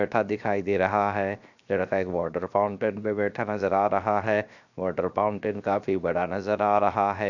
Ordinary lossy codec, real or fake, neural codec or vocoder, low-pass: none; fake; codec, 16 kHz, 0.7 kbps, FocalCodec; 7.2 kHz